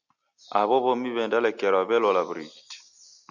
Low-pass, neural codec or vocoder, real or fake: 7.2 kHz; none; real